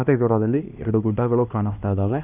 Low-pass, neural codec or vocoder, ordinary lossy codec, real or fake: 3.6 kHz; codec, 16 kHz, 1 kbps, X-Codec, HuBERT features, trained on LibriSpeech; none; fake